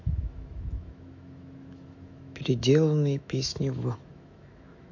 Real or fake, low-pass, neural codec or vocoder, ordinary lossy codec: fake; 7.2 kHz; autoencoder, 48 kHz, 128 numbers a frame, DAC-VAE, trained on Japanese speech; AAC, 48 kbps